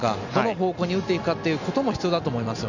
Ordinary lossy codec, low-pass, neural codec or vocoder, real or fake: none; 7.2 kHz; none; real